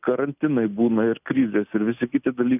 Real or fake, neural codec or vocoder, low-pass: real; none; 3.6 kHz